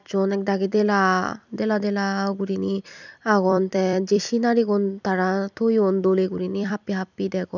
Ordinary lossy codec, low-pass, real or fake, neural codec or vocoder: none; 7.2 kHz; fake; vocoder, 44.1 kHz, 128 mel bands every 256 samples, BigVGAN v2